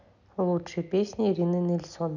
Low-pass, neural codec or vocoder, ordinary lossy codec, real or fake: 7.2 kHz; none; none; real